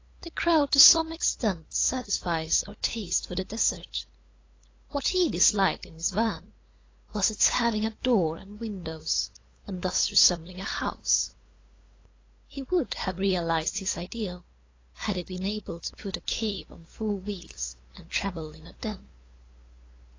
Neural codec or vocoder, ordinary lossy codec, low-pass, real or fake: codec, 16 kHz, 8 kbps, FunCodec, trained on LibriTTS, 25 frames a second; AAC, 32 kbps; 7.2 kHz; fake